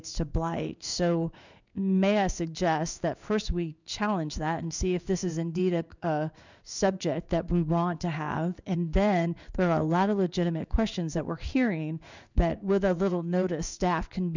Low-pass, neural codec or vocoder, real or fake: 7.2 kHz; codec, 16 kHz in and 24 kHz out, 1 kbps, XY-Tokenizer; fake